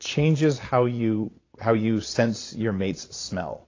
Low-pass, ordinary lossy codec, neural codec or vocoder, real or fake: 7.2 kHz; AAC, 32 kbps; vocoder, 22.05 kHz, 80 mel bands, Vocos; fake